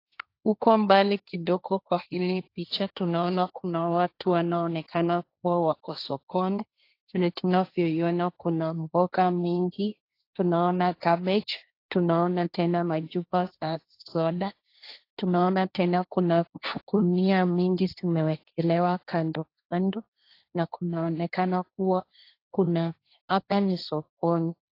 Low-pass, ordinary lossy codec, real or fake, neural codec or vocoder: 5.4 kHz; AAC, 32 kbps; fake; codec, 16 kHz, 1.1 kbps, Voila-Tokenizer